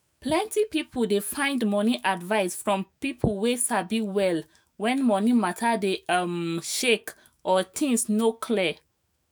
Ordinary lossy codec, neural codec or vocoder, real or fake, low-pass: none; autoencoder, 48 kHz, 128 numbers a frame, DAC-VAE, trained on Japanese speech; fake; none